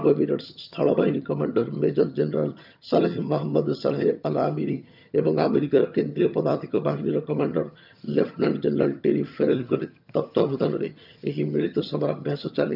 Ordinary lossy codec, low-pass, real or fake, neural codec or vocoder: none; 5.4 kHz; fake; vocoder, 22.05 kHz, 80 mel bands, HiFi-GAN